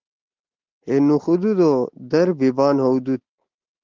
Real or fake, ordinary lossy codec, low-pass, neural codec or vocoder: real; Opus, 16 kbps; 7.2 kHz; none